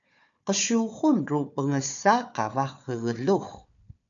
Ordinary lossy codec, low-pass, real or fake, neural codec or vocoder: MP3, 96 kbps; 7.2 kHz; fake; codec, 16 kHz, 4 kbps, FunCodec, trained on Chinese and English, 50 frames a second